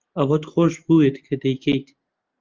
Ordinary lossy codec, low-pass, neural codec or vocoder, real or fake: Opus, 32 kbps; 7.2 kHz; none; real